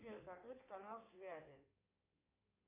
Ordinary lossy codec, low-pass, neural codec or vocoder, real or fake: AAC, 32 kbps; 3.6 kHz; codec, 16 kHz in and 24 kHz out, 2.2 kbps, FireRedTTS-2 codec; fake